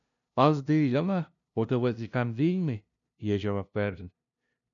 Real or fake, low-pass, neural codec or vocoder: fake; 7.2 kHz; codec, 16 kHz, 0.5 kbps, FunCodec, trained on LibriTTS, 25 frames a second